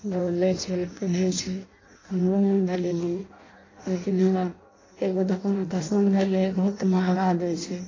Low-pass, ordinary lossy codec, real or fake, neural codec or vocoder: 7.2 kHz; AAC, 32 kbps; fake; codec, 16 kHz in and 24 kHz out, 0.6 kbps, FireRedTTS-2 codec